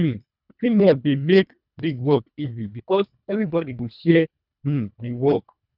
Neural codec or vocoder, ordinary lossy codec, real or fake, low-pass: codec, 24 kHz, 1.5 kbps, HILCodec; none; fake; 5.4 kHz